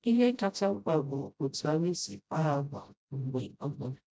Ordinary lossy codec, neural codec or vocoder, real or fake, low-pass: none; codec, 16 kHz, 0.5 kbps, FreqCodec, smaller model; fake; none